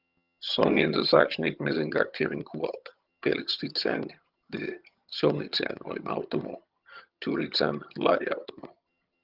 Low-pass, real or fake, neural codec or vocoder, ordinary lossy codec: 5.4 kHz; fake; vocoder, 22.05 kHz, 80 mel bands, HiFi-GAN; Opus, 24 kbps